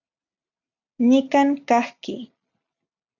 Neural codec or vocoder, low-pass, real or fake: none; 7.2 kHz; real